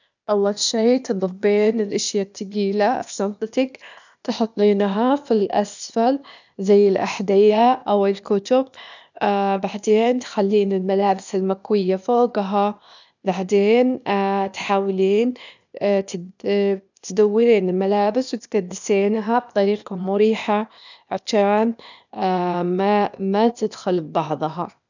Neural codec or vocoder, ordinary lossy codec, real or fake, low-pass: codec, 16 kHz, 0.8 kbps, ZipCodec; none; fake; 7.2 kHz